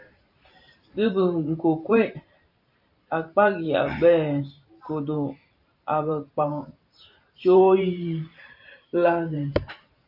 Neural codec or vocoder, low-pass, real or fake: vocoder, 44.1 kHz, 128 mel bands every 512 samples, BigVGAN v2; 5.4 kHz; fake